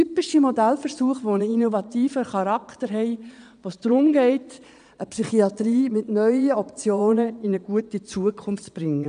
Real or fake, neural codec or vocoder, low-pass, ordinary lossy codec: fake; vocoder, 22.05 kHz, 80 mel bands, Vocos; 9.9 kHz; none